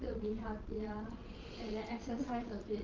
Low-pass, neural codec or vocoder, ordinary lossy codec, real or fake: 7.2 kHz; vocoder, 22.05 kHz, 80 mel bands, Vocos; Opus, 24 kbps; fake